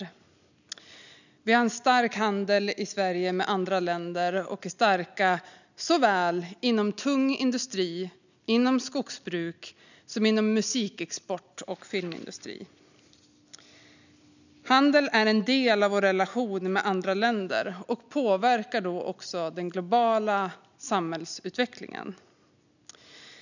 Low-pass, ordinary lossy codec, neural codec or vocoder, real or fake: 7.2 kHz; none; none; real